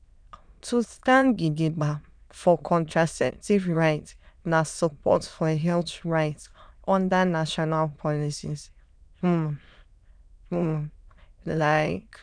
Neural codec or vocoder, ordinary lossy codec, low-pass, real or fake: autoencoder, 22.05 kHz, a latent of 192 numbers a frame, VITS, trained on many speakers; none; 9.9 kHz; fake